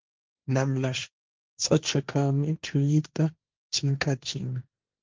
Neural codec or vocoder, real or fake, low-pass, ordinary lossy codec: codec, 16 kHz, 1.1 kbps, Voila-Tokenizer; fake; 7.2 kHz; Opus, 24 kbps